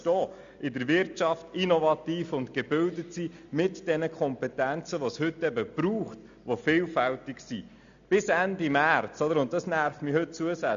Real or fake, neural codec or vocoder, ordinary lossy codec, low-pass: real; none; MP3, 64 kbps; 7.2 kHz